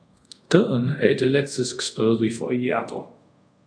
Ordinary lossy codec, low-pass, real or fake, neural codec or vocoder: AAC, 64 kbps; 9.9 kHz; fake; codec, 24 kHz, 0.5 kbps, DualCodec